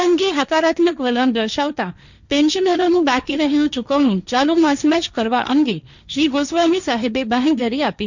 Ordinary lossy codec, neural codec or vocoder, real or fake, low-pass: none; codec, 16 kHz, 1.1 kbps, Voila-Tokenizer; fake; 7.2 kHz